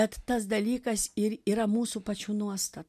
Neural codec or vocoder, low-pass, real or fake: none; 14.4 kHz; real